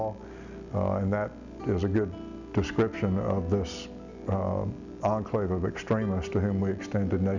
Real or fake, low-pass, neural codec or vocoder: real; 7.2 kHz; none